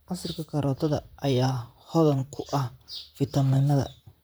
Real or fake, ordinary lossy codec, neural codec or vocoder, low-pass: fake; none; vocoder, 44.1 kHz, 128 mel bands, Pupu-Vocoder; none